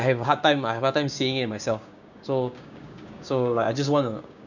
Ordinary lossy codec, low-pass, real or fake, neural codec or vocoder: none; 7.2 kHz; fake; codec, 16 kHz, 6 kbps, DAC